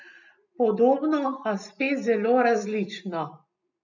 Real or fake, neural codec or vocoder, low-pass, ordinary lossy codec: real; none; 7.2 kHz; none